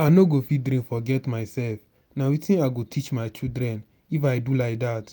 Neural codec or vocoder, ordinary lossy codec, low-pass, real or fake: none; none; none; real